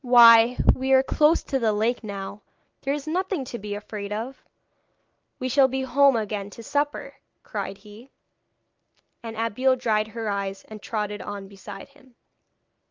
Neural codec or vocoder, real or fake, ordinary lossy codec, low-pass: none; real; Opus, 24 kbps; 7.2 kHz